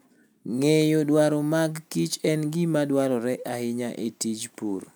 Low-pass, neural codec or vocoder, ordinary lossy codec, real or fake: none; none; none; real